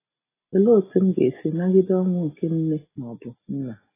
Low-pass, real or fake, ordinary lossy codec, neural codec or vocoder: 3.6 kHz; real; AAC, 16 kbps; none